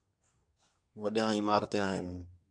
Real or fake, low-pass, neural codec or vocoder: fake; 9.9 kHz; codec, 24 kHz, 1 kbps, SNAC